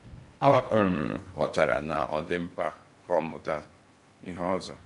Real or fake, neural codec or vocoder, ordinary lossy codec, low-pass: fake; codec, 16 kHz in and 24 kHz out, 0.8 kbps, FocalCodec, streaming, 65536 codes; MP3, 64 kbps; 10.8 kHz